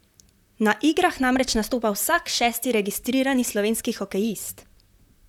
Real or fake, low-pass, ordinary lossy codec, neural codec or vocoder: fake; 19.8 kHz; none; vocoder, 44.1 kHz, 128 mel bands, Pupu-Vocoder